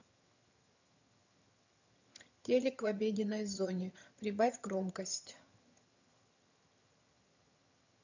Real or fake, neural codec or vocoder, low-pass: fake; vocoder, 22.05 kHz, 80 mel bands, HiFi-GAN; 7.2 kHz